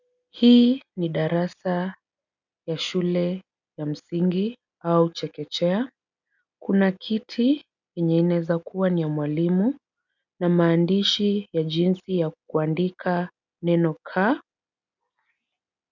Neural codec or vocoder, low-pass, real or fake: none; 7.2 kHz; real